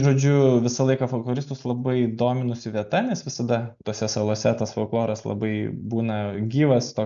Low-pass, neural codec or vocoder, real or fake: 7.2 kHz; none; real